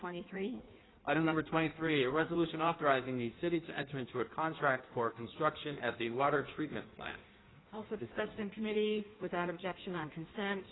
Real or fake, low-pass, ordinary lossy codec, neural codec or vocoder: fake; 7.2 kHz; AAC, 16 kbps; codec, 16 kHz in and 24 kHz out, 1.1 kbps, FireRedTTS-2 codec